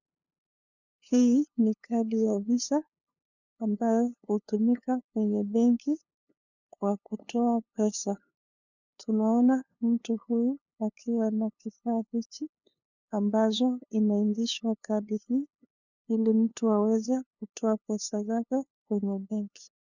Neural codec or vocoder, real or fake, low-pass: codec, 16 kHz, 2 kbps, FunCodec, trained on LibriTTS, 25 frames a second; fake; 7.2 kHz